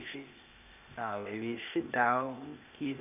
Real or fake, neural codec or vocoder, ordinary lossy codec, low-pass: fake; codec, 16 kHz, 0.8 kbps, ZipCodec; none; 3.6 kHz